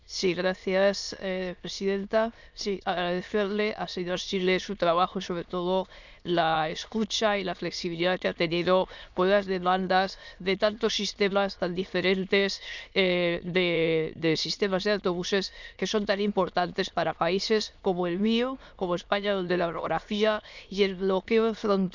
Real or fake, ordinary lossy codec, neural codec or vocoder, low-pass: fake; none; autoencoder, 22.05 kHz, a latent of 192 numbers a frame, VITS, trained on many speakers; 7.2 kHz